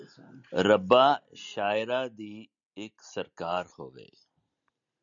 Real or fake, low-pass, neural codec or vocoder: real; 7.2 kHz; none